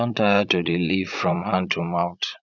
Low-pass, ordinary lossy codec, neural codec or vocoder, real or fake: 7.2 kHz; none; vocoder, 44.1 kHz, 80 mel bands, Vocos; fake